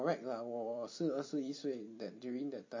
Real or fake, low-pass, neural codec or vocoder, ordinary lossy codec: fake; 7.2 kHz; vocoder, 44.1 kHz, 128 mel bands every 256 samples, BigVGAN v2; MP3, 32 kbps